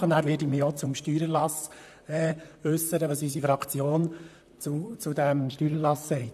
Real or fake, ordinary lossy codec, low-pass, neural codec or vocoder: fake; AAC, 96 kbps; 14.4 kHz; vocoder, 44.1 kHz, 128 mel bands, Pupu-Vocoder